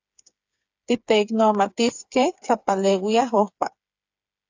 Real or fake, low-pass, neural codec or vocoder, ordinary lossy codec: fake; 7.2 kHz; codec, 16 kHz, 8 kbps, FreqCodec, smaller model; AAC, 48 kbps